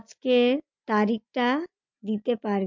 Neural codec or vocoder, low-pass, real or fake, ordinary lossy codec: none; 7.2 kHz; real; MP3, 48 kbps